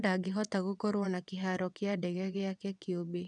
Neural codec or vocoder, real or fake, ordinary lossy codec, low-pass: vocoder, 22.05 kHz, 80 mel bands, WaveNeXt; fake; none; 9.9 kHz